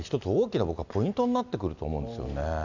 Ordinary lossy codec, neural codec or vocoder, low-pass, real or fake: none; none; 7.2 kHz; real